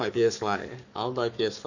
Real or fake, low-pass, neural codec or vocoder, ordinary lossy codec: fake; 7.2 kHz; codec, 44.1 kHz, 7.8 kbps, Pupu-Codec; none